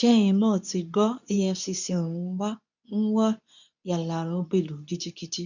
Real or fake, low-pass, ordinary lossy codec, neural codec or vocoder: fake; 7.2 kHz; none; codec, 24 kHz, 0.9 kbps, WavTokenizer, medium speech release version 2